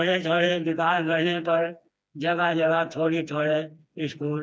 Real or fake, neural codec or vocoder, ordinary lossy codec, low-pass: fake; codec, 16 kHz, 2 kbps, FreqCodec, smaller model; none; none